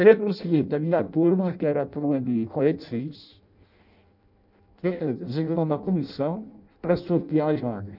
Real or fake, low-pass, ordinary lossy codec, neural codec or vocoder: fake; 5.4 kHz; none; codec, 16 kHz in and 24 kHz out, 0.6 kbps, FireRedTTS-2 codec